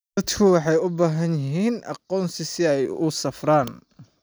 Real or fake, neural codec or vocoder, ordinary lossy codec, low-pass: real; none; none; none